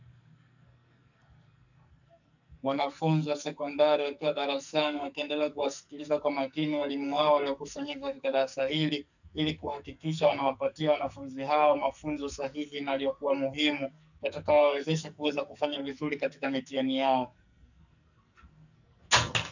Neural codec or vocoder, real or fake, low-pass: codec, 44.1 kHz, 2.6 kbps, SNAC; fake; 7.2 kHz